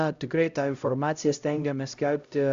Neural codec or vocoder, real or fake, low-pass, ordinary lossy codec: codec, 16 kHz, 0.5 kbps, X-Codec, HuBERT features, trained on LibriSpeech; fake; 7.2 kHz; Opus, 64 kbps